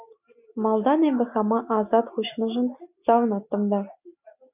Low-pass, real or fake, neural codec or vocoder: 3.6 kHz; real; none